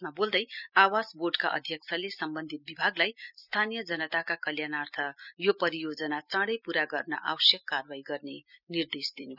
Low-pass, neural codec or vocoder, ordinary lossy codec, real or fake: 5.4 kHz; none; none; real